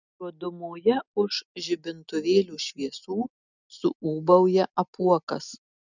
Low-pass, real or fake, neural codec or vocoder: 7.2 kHz; real; none